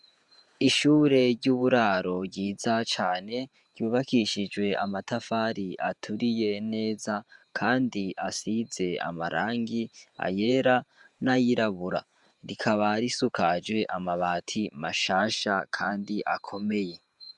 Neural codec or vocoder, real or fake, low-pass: vocoder, 48 kHz, 128 mel bands, Vocos; fake; 10.8 kHz